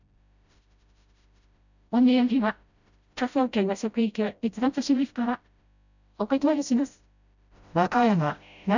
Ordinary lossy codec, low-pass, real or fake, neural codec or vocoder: none; 7.2 kHz; fake; codec, 16 kHz, 0.5 kbps, FreqCodec, smaller model